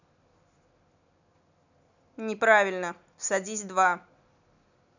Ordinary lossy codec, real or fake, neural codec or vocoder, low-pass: none; real; none; 7.2 kHz